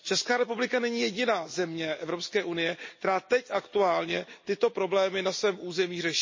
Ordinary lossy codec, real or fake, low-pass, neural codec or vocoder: MP3, 32 kbps; real; 7.2 kHz; none